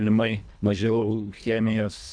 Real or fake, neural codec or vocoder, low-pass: fake; codec, 24 kHz, 1.5 kbps, HILCodec; 9.9 kHz